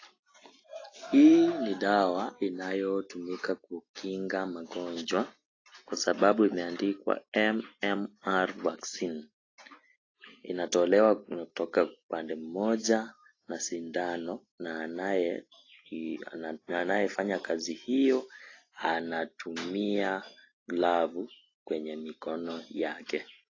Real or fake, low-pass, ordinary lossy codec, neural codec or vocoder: real; 7.2 kHz; AAC, 32 kbps; none